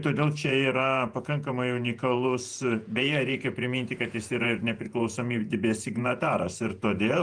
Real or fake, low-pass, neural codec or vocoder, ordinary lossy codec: fake; 9.9 kHz; vocoder, 48 kHz, 128 mel bands, Vocos; Opus, 32 kbps